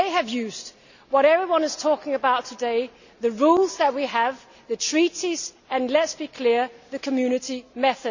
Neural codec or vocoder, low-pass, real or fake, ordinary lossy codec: none; 7.2 kHz; real; none